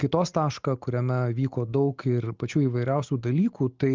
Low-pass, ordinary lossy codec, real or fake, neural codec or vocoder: 7.2 kHz; Opus, 24 kbps; real; none